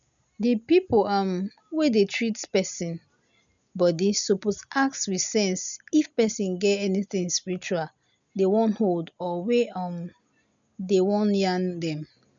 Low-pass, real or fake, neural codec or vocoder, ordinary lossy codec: 7.2 kHz; real; none; none